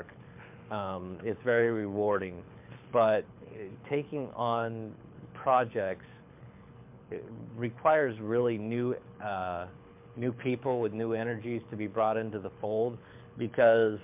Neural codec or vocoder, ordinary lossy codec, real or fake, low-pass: codec, 24 kHz, 6 kbps, HILCodec; MP3, 32 kbps; fake; 3.6 kHz